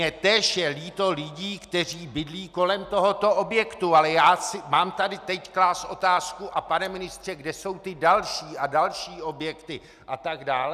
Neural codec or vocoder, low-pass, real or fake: none; 14.4 kHz; real